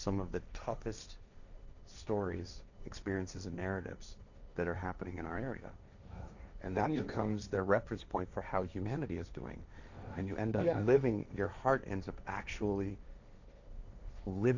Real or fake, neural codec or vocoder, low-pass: fake; codec, 16 kHz, 1.1 kbps, Voila-Tokenizer; 7.2 kHz